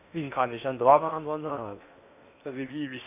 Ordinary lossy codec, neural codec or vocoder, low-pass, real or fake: none; codec, 16 kHz in and 24 kHz out, 0.8 kbps, FocalCodec, streaming, 65536 codes; 3.6 kHz; fake